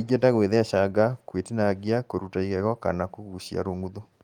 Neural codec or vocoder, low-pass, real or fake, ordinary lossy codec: none; 19.8 kHz; real; Opus, 32 kbps